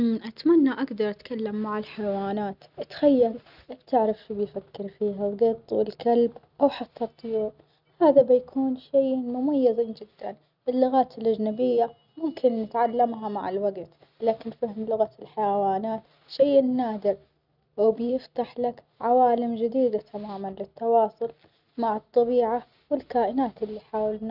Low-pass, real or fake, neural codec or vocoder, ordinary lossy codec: 5.4 kHz; real; none; none